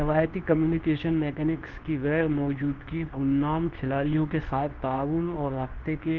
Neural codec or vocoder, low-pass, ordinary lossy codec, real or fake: codec, 24 kHz, 0.9 kbps, WavTokenizer, medium speech release version 2; 7.2 kHz; Opus, 16 kbps; fake